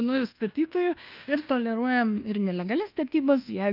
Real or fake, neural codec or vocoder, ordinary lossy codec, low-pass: fake; codec, 24 kHz, 1.2 kbps, DualCodec; Opus, 24 kbps; 5.4 kHz